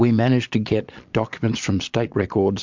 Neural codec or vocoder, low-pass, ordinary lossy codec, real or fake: vocoder, 22.05 kHz, 80 mel bands, Vocos; 7.2 kHz; MP3, 64 kbps; fake